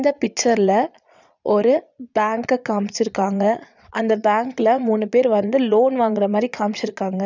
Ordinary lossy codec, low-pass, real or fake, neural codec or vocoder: none; 7.2 kHz; fake; vocoder, 22.05 kHz, 80 mel bands, Vocos